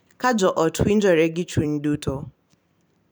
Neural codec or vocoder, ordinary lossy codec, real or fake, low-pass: vocoder, 44.1 kHz, 128 mel bands every 256 samples, BigVGAN v2; none; fake; none